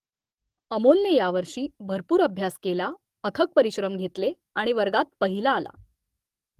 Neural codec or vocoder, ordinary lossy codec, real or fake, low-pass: codec, 44.1 kHz, 7.8 kbps, DAC; Opus, 24 kbps; fake; 14.4 kHz